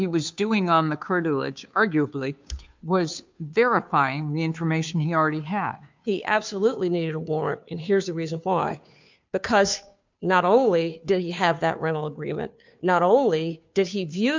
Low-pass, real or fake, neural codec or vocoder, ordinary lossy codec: 7.2 kHz; fake; codec, 16 kHz, 2 kbps, FunCodec, trained on Chinese and English, 25 frames a second; MP3, 64 kbps